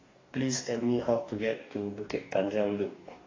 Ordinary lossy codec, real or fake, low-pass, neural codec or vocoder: AAC, 32 kbps; fake; 7.2 kHz; codec, 44.1 kHz, 2.6 kbps, DAC